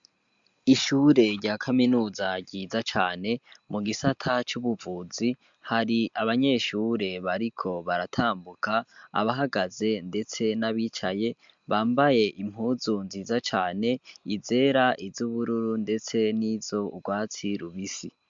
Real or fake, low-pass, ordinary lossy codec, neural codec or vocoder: real; 7.2 kHz; MP3, 64 kbps; none